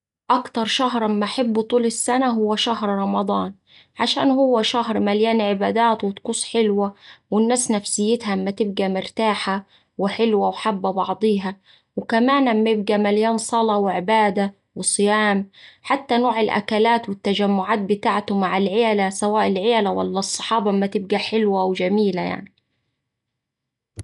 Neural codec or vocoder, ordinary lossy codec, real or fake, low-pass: none; none; real; 10.8 kHz